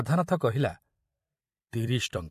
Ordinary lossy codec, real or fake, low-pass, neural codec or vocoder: MP3, 64 kbps; fake; 14.4 kHz; vocoder, 44.1 kHz, 128 mel bands every 256 samples, BigVGAN v2